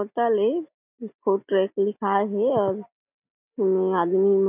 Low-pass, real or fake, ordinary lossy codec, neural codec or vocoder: 3.6 kHz; real; none; none